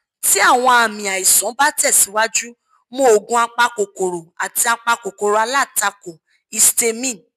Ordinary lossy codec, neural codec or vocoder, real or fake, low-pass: none; none; real; 14.4 kHz